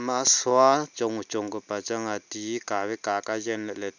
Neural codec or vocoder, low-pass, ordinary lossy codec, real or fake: none; 7.2 kHz; none; real